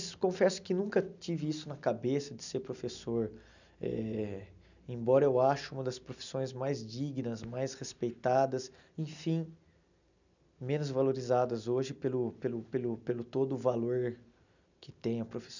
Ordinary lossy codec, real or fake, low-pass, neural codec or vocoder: none; real; 7.2 kHz; none